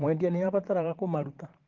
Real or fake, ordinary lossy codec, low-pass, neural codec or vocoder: fake; Opus, 16 kbps; 7.2 kHz; vocoder, 44.1 kHz, 80 mel bands, Vocos